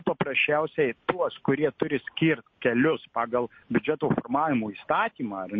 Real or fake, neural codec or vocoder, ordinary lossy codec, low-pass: real; none; MP3, 32 kbps; 7.2 kHz